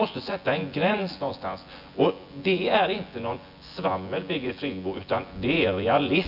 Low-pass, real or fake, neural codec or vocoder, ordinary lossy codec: 5.4 kHz; fake; vocoder, 24 kHz, 100 mel bands, Vocos; none